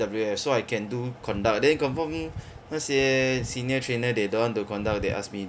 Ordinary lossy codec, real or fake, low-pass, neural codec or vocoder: none; real; none; none